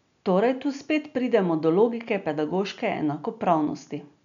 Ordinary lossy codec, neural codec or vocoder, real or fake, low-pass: none; none; real; 7.2 kHz